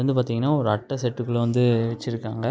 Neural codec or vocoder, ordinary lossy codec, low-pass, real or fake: none; none; none; real